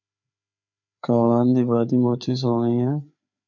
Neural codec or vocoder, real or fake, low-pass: codec, 16 kHz, 4 kbps, FreqCodec, larger model; fake; 7.2 kHz